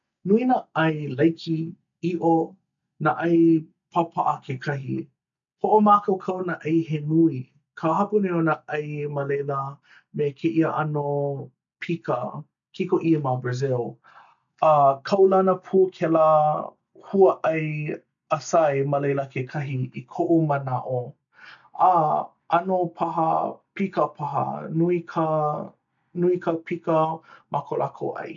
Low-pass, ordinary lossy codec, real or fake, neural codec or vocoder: 7.2 kHz; none; real; none